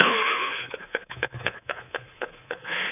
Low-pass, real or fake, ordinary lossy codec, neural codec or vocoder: 3.6 kHz; fake; none; codec, 24 kHz, 3.1 kbps, DualCodec